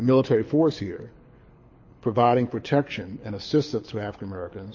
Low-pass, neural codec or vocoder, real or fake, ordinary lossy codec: 7.2 kHz; codec, 24 kHz, 6 kbps, HILCodec; fake; MP3, 32 kbps